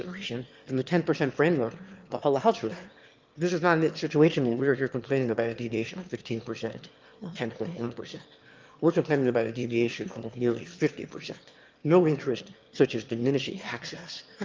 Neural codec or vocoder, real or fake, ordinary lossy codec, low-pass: autoencoder, 22.05 kHz, a latent of 192 numbers a frame, VITS, trained on one speaker; fake; Opus, 32 kbps; 7.2 kHz